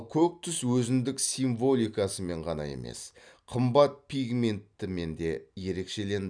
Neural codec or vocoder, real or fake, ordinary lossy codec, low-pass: none; real; none; 9.9 kHz